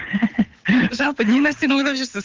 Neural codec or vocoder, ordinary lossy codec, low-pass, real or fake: codec, 24 kHz, 6 kbps, HILCodec; Opus, 32 kbps; 7.2 kHz; fake